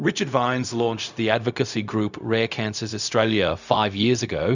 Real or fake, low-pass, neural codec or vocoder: fake; 7.2 kHz; codec, 16 kHz, 0.4 kbps, LongCat-Audio-Codec